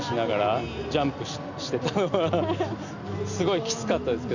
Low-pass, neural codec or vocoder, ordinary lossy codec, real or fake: 7.2 kHz; none; none; real